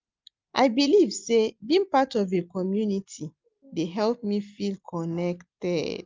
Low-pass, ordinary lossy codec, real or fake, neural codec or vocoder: 7.2 kHz; Opus, 24 kbps; real; none